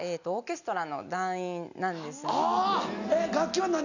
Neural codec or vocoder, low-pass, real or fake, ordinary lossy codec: none; 7.2 kHz; real; none